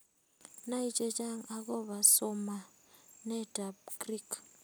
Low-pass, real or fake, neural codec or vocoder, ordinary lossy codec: none; real; none; none